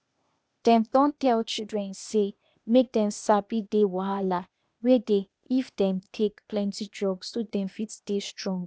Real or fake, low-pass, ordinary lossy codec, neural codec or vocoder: fake; none; none; codec, 16 kHz, 0.8 kbps, ZipCodec